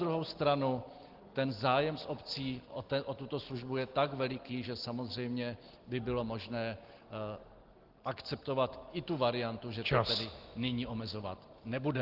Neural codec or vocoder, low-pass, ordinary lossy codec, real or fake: none; 5.4 kHz; Opus, 16 kbps; real